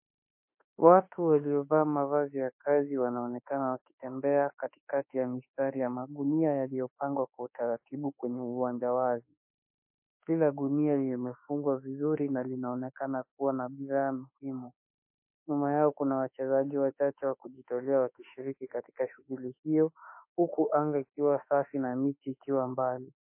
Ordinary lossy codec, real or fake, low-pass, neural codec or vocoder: MP3, 24 kbps; fake; 3.6 kHz; autoencoder, 48 kHz, 32 numbers a frame, DAC-VAE, trained on Japanese speech